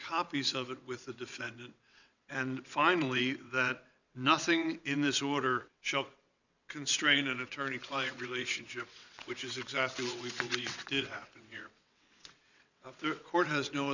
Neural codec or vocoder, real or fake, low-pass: vocoder, 22.05 kHz, 80 mel bands, WaveNeXt; fake; 7.2 kHz